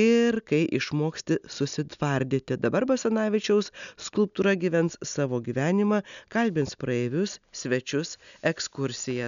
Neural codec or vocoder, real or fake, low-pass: none; real; 7.2 kHz